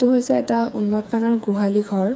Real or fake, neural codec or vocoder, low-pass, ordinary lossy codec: fake; codec, 16 kHz, 4 kbps, FreqCodec, smaller model; none; none